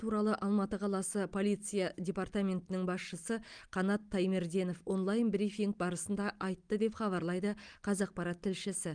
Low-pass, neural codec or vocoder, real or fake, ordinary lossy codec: 9.9 kHz; none; real; Opus, 32 kbps